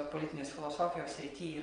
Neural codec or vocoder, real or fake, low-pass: vocoder, 22.05 kHz, 80 mel bands, WaveNeXt; fake; 9.9 kHz